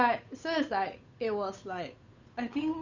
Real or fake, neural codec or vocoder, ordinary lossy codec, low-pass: fake; codec, 16 kHz, 8 kbps, FunCodec, trained on Chinese and English, 25 frames a second; Opus, 64 kbps; 7.2 kHz